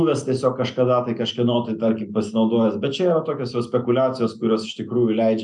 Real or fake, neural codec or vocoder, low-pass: real; none; 10.8 kHz